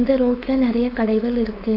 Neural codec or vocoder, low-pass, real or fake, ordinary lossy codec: codec, 16 kHz, 4.8 kbps, FACodec; 5.4 kHz; fake; AAC, 32 kbps